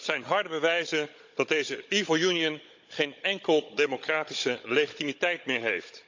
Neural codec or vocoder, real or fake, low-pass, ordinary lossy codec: codec, 16 kHz, 16 kbps, FunCodec, trained on Chinese and English, 50 frames a second; fake; 7.2 kHz; MP3, 64 kbps